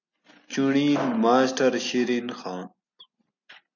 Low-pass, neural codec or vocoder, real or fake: 7.2 kHz; none; real